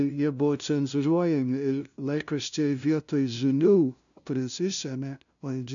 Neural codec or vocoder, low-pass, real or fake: codec, 16 kHz, 0.5 kbps, FunCodec, trained on LibriTTS, 25 frames a second; 7.2 kHz; fake